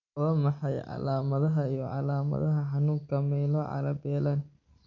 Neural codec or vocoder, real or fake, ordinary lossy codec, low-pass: none; real; none; 7.2 kHz